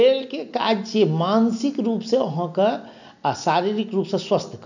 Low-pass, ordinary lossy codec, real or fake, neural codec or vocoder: 7.2 kHz; none; real; none